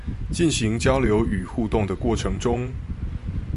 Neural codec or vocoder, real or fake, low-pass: vocoder, 24 kHz, 100 mel bands, Vocos; fake; 10.8 kHz